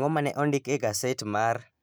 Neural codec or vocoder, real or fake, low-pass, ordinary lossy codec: none; real; none; none